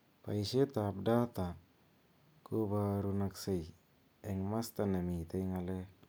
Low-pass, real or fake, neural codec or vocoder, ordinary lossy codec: none; real; none; none